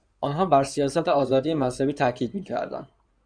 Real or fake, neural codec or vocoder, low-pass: fake; codec, 16 kHz in and 24 kHz out, 2.2 kbps, FireRedTTS-2 codec; 9.9 kHz